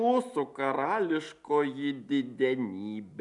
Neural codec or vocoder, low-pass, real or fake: none; 10.8 kHz; real